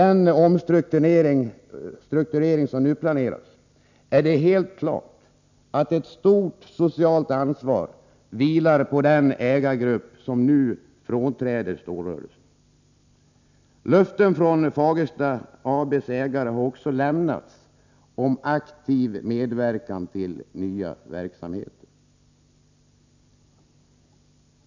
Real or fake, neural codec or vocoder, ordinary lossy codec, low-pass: real; none; none; 7.2 kHz